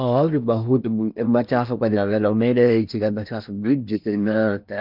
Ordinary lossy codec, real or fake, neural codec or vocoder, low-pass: none; fake; codec, 16 kHz in and 24 kHz out, 0.8 kbps, FocalCodec, streaming, 65536 codes; 5.4 kHz